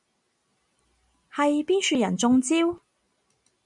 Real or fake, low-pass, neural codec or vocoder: real; 10.8 kHz; none